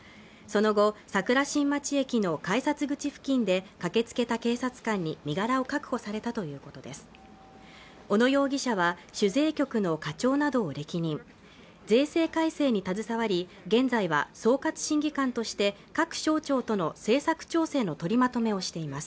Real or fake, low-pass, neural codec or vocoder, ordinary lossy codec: real; none; none; none